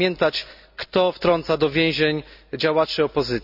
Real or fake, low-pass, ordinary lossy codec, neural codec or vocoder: real; 5.4 kHz; none; none